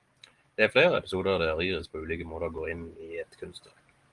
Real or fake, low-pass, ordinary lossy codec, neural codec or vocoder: real; 10.8 kHz; Opus, 24 kbps; none